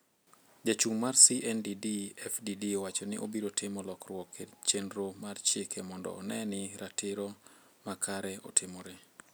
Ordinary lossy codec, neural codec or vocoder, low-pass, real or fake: none; none; none; real